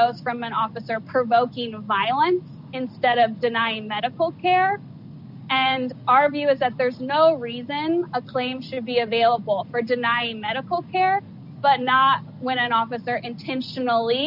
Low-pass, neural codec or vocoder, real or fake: 5.4 kHz; none; real